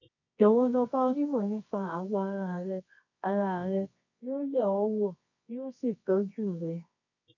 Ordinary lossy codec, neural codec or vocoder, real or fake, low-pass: none; codec, 24 kHz, 0.9 kbps, WavTokenizer, medium music audio release; fake; 7.2 kHz